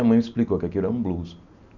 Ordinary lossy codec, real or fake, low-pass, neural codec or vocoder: none; real; 7.2 kHz; none